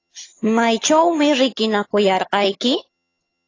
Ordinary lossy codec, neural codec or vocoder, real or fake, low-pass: AAC, 32 kbps; vocoder, 22.05 kHz, 80 mel bands, HiFi-GAN; fake; 7.2 kHz